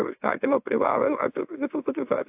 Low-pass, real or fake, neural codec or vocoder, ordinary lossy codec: 3.6 kHz; fake; autoencoder, 44.1 kHz, a latent of 192 numbers a frame, MeloTTS; AAC, 32 kbps